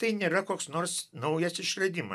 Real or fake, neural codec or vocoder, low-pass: real; none; 14.4 kHz